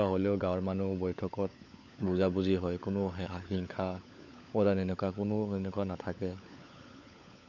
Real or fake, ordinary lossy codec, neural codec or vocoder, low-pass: fake; none; codec, 16 kHz, 16 kbps, FunCodec, trained on LibriTTS, 50 frames a second; 7.2 kHz